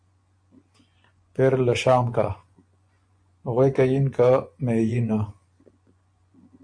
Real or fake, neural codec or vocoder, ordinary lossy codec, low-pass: fake; vocoder, 24 kHz, 100 mel bands, Vocos; AAC, 64 kbps; 9.9 kHz